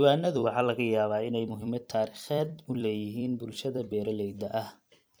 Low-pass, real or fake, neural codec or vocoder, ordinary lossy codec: none; fake; vocoder, 44.1 kHz, 128 mel bands every 256 samples, BigVGAN v2; none